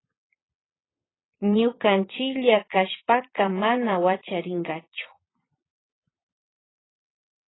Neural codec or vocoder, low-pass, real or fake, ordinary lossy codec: vocoder, 22.05 kHz, 80 mel bands, Vocos; 7.2 kHz; fake; AAC, 16 kbps